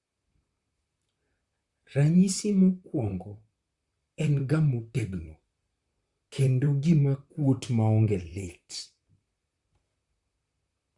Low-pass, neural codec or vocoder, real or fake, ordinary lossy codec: 10.8 kHz; vocoder, 44.1 kHz, 128 mel bands, Pupu-Vocoder; fake; Opus, 64 kbps